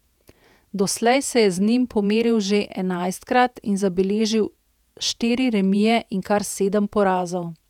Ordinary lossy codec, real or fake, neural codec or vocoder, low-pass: none; fake; vocoder, 48 kHz, 128 mel bands, Vocos; 19.8 kHz